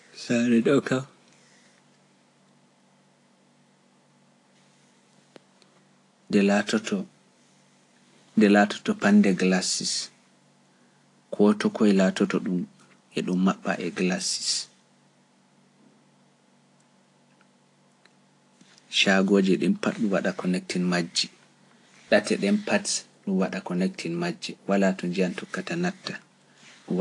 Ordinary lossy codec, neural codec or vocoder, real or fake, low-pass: AAC, 48 kbps; none; real; 10.8 kHz